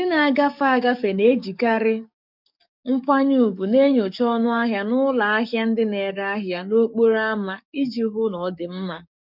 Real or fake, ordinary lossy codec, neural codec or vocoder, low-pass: fake; none; codec, 44.1 kHz, 7.8 kbps, DAC; 5.4 kHz